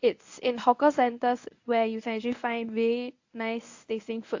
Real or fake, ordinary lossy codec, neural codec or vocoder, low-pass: fake; AAC, 48 kbps; codec, 24 kHz, 0.9 kbps, WavTokenizer, medium speech release version 1; 7.2 kHz